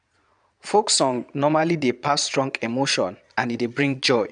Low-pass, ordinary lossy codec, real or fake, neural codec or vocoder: 9.9 kHz; none; fake; vocoder, 22.05 kHz, 80 mel bands, Vocos